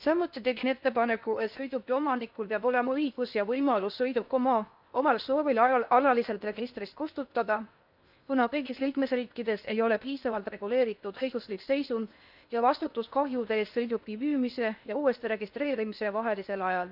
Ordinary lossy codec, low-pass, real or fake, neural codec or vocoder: none; 5.4 kHz; fake; codec, 16 kHz in and 24 kHz out, 0.8 kbps, FocalCodec, streaming, 65536 codes